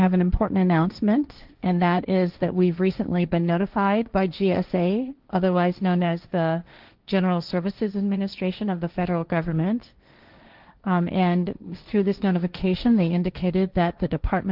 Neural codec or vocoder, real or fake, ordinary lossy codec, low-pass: codec, 16 kHz, 1.1 kbps, Voila-Tokenizer; fake; Opus, 24 kbps; 5.4 kHz